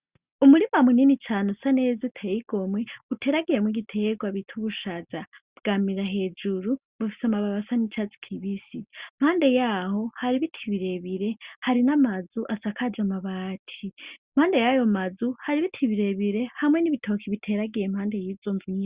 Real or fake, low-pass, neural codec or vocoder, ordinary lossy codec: real; 3.6 kHz; none; Opus, 64 kbps